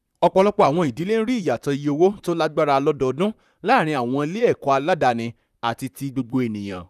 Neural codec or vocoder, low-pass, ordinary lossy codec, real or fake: vocoder, 44.1 kHz, 128 mel bands, Pupu-Vocoder; 14.4 kHz; none; fake